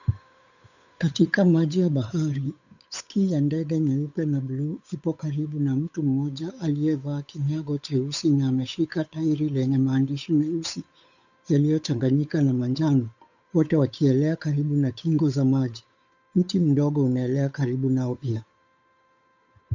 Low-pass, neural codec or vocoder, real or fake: 7.2 kHz; codec, 16 kHz, 8 kbps, FunCodec, trained on Chinese and English, 25 frames a second; fake